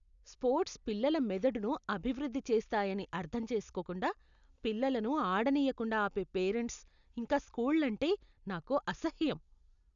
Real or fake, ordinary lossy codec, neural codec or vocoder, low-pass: real; none; none; 7.2 kHz